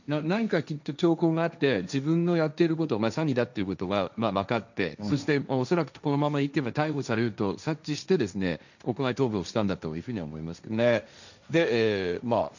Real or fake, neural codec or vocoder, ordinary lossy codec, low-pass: fake; codec, 16 kHz, 1.1 kbps, Voila-Tokenizer; none; 7.2 kHz